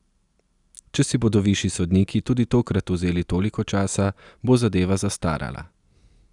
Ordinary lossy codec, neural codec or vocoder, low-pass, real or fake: none; none; 10.8 kHz; real